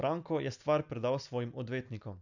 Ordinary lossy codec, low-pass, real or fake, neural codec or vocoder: none; 7.2 kHz; real; none